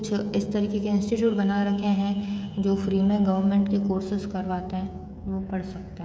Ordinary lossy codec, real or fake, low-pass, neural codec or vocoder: none; fake; none; codec, 16 kHz, 16 kbps, FreqCodec, smaller model